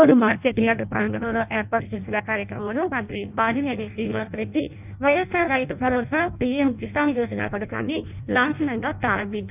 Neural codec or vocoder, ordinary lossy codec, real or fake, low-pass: codec, 16 kHz in and 24 kHz out, 0.6 kbps, FireRedTTS-2 codec; none; fake; 3.6 kHz